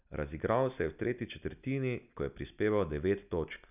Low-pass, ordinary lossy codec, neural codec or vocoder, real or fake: 3.6 kHz; none; none; real